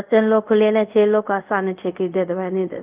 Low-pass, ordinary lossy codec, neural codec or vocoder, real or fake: 3.6 kHz; Opus, 24 kbps; codec, 24 kHz, 0.5 kbps, DualCodec; fake